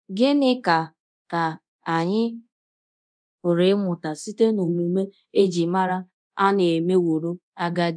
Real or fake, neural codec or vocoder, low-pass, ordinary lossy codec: fake; codec, 24 kHz, 0.9 kbps, DualCodec; 9.9 kHz; MP3, 96 kbps